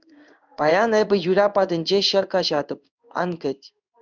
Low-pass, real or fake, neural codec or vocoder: 7.2 kHz; fake; codec, 16 kHz in and 24 kHz out, 1 kbps, XY-Tokenizer